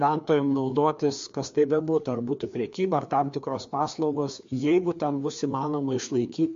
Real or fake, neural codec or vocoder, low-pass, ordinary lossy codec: fake; codec, 16 kHz, 2 kbps, FreqCodec, larger model; 7.2 kHz; MP3, 48 kbps